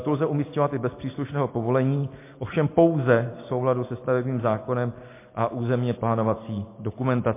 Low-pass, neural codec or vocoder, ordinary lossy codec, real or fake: 3.6 kHz; none; MP3, 24 kbps; real